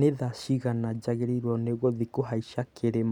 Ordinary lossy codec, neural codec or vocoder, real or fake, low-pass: none; none; real; 19.8 kHz